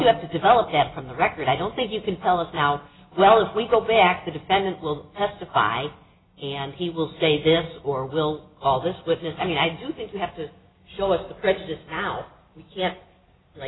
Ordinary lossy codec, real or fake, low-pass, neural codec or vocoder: AAC, 16 kbps; real; 7.2 kHz; none